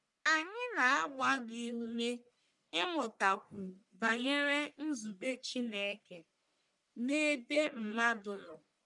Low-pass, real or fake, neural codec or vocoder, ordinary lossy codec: 10.8 kHz; fake; codec, 44.1 kHz, 1.7 kbps, Pupu-Codec; none